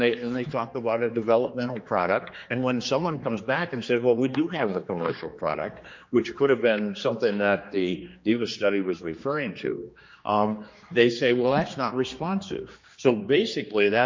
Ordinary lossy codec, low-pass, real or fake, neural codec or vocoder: MP3, 48 kbps; 7.2 kHz; fake; codec, 16 kHz, 2 kbps, X-Codec, HuBERT features, trained on general audio